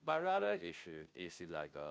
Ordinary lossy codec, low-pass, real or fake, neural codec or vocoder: none; none; fake; codec, 16 kHz, 0.5 kbps, FunCodec, trained on Chinese and English, 25 frames a second